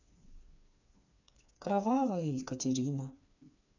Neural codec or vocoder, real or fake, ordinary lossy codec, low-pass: codec, 16 kHz, 4 kbps, FreqCodec, smaller model; fake; none; 7.2 kHz